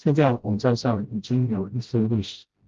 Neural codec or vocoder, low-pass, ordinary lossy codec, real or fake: codec, 16 kHz, 0.5 kbps, FreqCodec, smaller model; 7.2 kHz; Opus, 32 kbps; fake